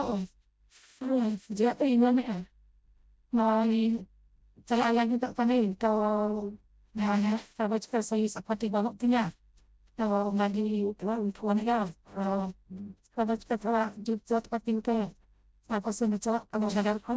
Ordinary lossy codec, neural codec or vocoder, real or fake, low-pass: none; codec, 16 kHz, 0.5 kbps, FreqCodec, smaller model; fake; none